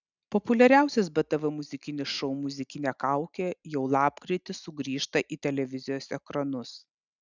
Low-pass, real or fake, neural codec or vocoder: 7.2 kHz; real; none